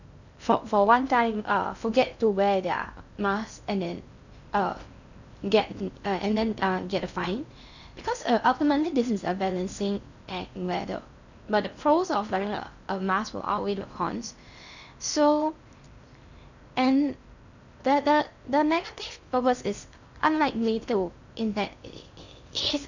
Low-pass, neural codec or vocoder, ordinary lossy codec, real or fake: 7.2 kHz; codec, 16 kHz in and 24 kHz out, 0.6 kbps, FocalCodec, streaming, 2048 codes; none; fake